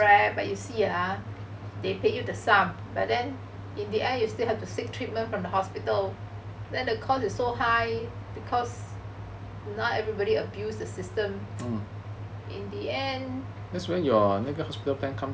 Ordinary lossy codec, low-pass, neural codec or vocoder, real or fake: none; none; none; real